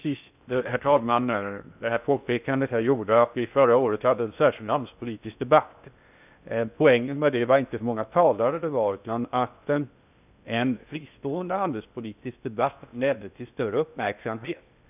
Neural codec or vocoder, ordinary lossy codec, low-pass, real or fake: codec, 16 kHz in and 24 kHz out, 0.6 kbps, FocalCodec, streaming, 2048 codes; none; 3.6 kHz; fake